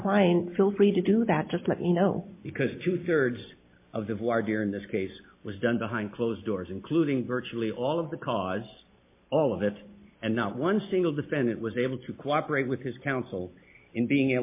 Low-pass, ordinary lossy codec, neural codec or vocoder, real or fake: 3.6 kHz; MP3, 24 kbps; none; real